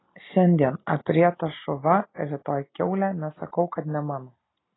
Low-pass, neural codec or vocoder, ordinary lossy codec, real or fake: 7.2 kHz; none; AAC, 16 kbps; real